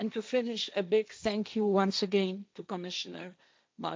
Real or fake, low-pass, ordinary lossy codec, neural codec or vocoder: fake; none; none; codec, 16 kHz, 1.1 kbps, Voila-Tokenizer